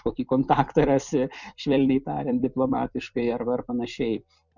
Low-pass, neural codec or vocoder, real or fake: 7.2 kHz; vocoder, 44.1 kHz, 128 mel bands every 256 samples, BigVGAN v2; fake